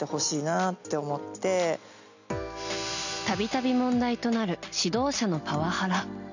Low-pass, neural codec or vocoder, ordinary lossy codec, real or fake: 7.2 kHz; none; none; real